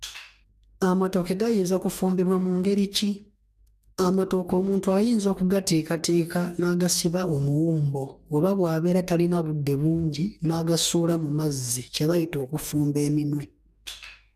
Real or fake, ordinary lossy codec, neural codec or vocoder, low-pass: fake; none; codec, 44.1 kHz, 2.6 kbps, DAC; 14.4 kHz